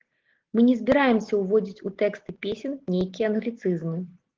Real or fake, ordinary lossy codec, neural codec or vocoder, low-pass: real; Opus, 16 kbps; none; 7.2 kHz